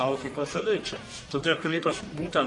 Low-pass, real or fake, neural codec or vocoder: 10.8 kHz; fake; codec, 44.1 kHz, 1.7 kbps, Pupu-Codec